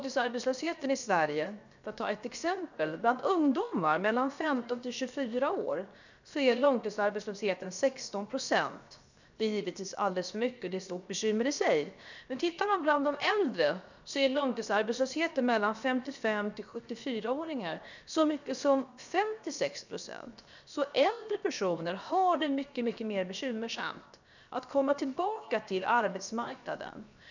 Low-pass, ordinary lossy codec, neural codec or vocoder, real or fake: 7.2 kHz; none; codec, 16 kHz, 0.7 kbps, FocalCodec; fake